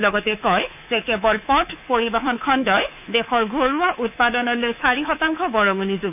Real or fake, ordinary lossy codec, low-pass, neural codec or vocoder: fake; none; 3.6 kHz; codec, 24 kHz, 3.1 kbps, DualCodec